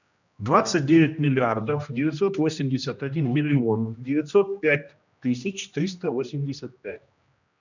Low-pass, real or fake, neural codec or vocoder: 7.2 kHz; fake; codec, 16 kHz, 1 kbps, X-Codec, HuBERT features, trained on general audio